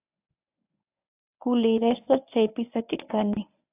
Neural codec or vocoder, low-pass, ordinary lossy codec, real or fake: codec, 24 kHz, 0.9 kbps, WavTokenizer, medium speech release version 1; 3.6 kHz; AAC, 32 kbps; fake